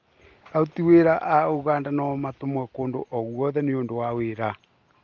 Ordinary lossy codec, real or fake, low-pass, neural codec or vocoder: Opus, 32 kbps; real; 7.2 kHz; none